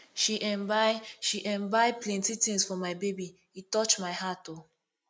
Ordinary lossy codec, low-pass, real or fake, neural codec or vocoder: none; none; real; none